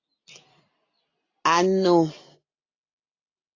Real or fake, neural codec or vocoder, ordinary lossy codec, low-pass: real; none; AAC, 32 kbps; 7.2 kHz